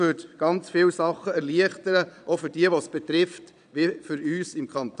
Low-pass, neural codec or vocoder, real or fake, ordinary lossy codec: 9.9 kHz; vocoder, 22.05 kHz, 80 mel bands, Vocos; fake; none